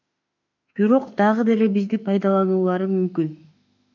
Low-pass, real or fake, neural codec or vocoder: 7.2 kHz; fake; autoencoder, 48 kHz, 32 numbers a frame, DAC-VAE, trained on Japanese speech